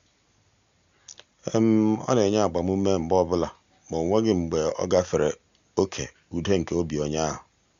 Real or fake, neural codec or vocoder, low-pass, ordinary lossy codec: real; none; 7.2 kHz; Opus, 64 kbps